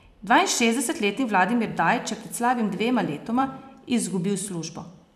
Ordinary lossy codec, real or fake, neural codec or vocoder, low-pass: none; fake; vocoder, 44.1 kHz, 128 mel bands every 256 samples, BigVGAN v2; 14.4 kHz